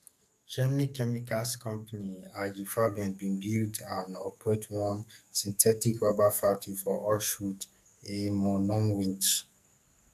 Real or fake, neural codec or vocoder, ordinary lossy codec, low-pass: fake; codec, 44.1 kHz, 2.6 kbps, SNAC; none; 14.4 kHz